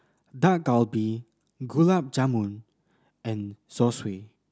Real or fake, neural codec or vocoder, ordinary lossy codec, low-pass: real; none; none; none